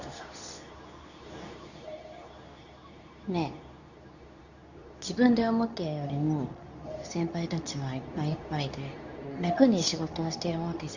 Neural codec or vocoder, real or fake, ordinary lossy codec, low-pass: codec, 24 kHz, 0.9 kbps, WavTokenizer, medium speech release version 2; fake; none; 7.2 kHz